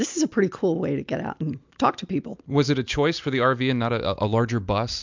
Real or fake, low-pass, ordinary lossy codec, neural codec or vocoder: real; 7.2 kHz; MP3, 64 kbps; none